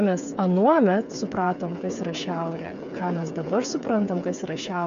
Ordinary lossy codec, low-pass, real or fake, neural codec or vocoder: MP3, 64 kbps; 7.2 kHz; fake; codec, 16 kHz, 8 kbps, FreqCodec, smaller model